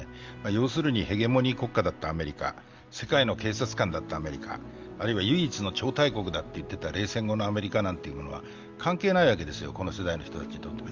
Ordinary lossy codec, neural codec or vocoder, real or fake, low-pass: Opus, 32 kbps; none; real; 7.2 kHz